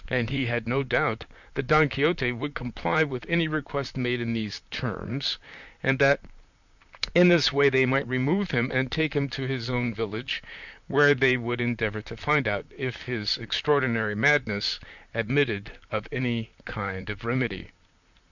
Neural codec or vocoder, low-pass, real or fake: vocoder, 44.1 kHz, 128 mel bands, Pupu-Vocoder; 7.2 kHz; fake